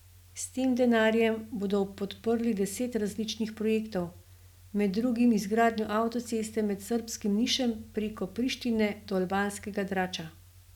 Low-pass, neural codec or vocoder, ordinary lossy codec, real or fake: 19.8 kHz; none; none; real